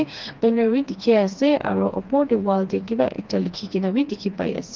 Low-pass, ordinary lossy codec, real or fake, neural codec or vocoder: 7.2 kHz; Opus, 32 kbps; fake; codec, 16 kHz, 2 kbps, FreqCodec, smaller model